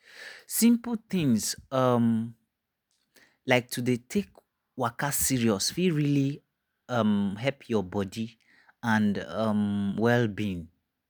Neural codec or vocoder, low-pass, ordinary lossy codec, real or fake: none; none; none; real